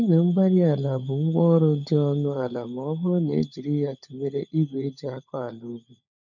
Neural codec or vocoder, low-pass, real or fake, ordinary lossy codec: codec, 16 kHz, 16 kbps, FunCodec, trained on LibriTTS, 50 frames a second; 7.2 kHz; fake; none